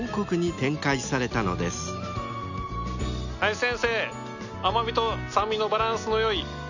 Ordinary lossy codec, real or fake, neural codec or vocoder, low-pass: none; real; none; 7.2 kHz